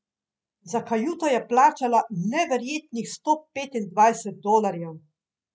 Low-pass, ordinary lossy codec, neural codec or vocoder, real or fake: none; none; none; real